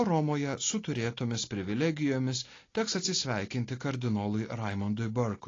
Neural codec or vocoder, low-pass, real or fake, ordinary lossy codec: none; 7.2 kHz; real; AAC, 32 kbps